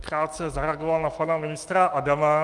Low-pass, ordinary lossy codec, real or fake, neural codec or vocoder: 10.8 kHz; Opus, 32 kbps; fake; autoencoder, 48 kHz, 128 numbers a frame, DAC-VAE, trained on Japanese speech